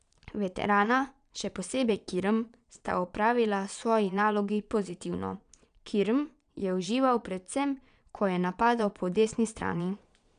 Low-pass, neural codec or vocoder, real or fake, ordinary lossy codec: 9.9 kHz; vocoder, 22.05 kHz, 80 mel bands, Vocos; fake; none